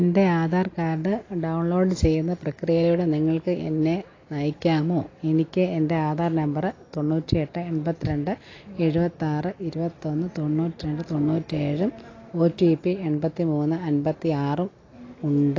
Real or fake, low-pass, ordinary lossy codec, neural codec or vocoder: real; 7.2 kHz; AAC, 32 kbps; none